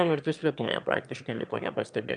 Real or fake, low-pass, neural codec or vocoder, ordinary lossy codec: fake; 9.9 kHz; autoencoder, 22.05 kHz, a latent of 192 numbers a frame, VITS, trained on one speaker; MP3, 96 kbps